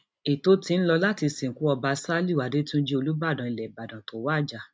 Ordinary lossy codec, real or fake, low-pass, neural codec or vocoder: none; real; none; none